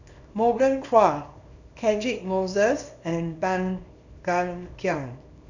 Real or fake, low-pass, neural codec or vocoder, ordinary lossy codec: fake; 7.2 kHz; codec, 24 kHz, 0.9 kbps, WavTokenizer, small release; none